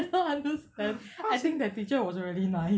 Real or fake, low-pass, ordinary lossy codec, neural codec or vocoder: real; none; none; none